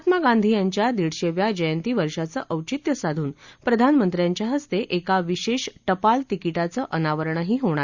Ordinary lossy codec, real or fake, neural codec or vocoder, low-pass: Opus, 64 kbps; real; none; 7.2 kHz